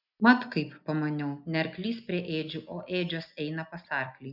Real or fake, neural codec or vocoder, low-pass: real; none; 5.4 kHz